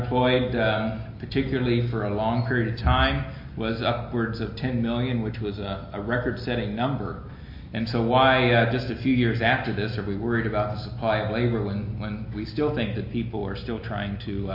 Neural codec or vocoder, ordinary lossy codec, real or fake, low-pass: none; MP3, 32 kbps; real; 5.4 kHz